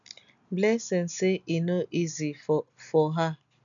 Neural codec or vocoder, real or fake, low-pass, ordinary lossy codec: none; real; 7.2 kHz; none